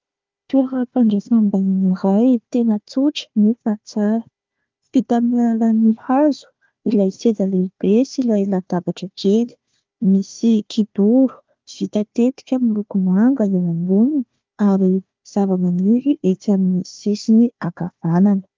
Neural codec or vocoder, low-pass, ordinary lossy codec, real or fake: codec, 16 kHz, 1 kbps, FunCodec, trained on Chinese and English, 50 frames a second; 7.2 kHz; Opus, 32 kbps; fake